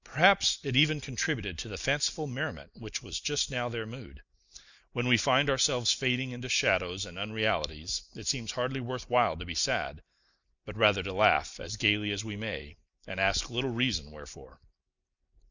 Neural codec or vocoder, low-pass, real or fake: none; 7.2 kHz; real